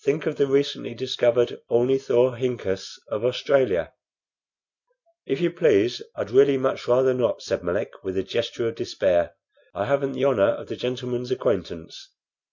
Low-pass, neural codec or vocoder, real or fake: 7.2 kHz; none; real